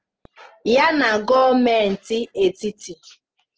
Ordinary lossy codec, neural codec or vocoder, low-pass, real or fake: Opus, 16 kbps; none; 7.2 kHz; real